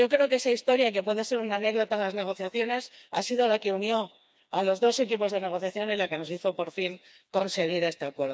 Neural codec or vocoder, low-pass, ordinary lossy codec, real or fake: codec, 16 kHz, 2 kbps, FreqCodec, smaller model; none; none; fake